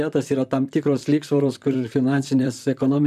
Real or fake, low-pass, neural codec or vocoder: fake; 14.4 kHz; vocoder, 44.1 kHz, 128 mel bands, Pupu-Vocoder